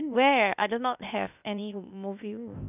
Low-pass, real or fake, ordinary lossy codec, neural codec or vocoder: 3.6 kHz; fake; none; codec, 16 kHz, 0.8 kbps, ZipCodec